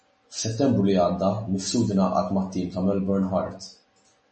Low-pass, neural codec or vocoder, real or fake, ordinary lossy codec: 10.8 kHz; none; real; MP3, 32 kbps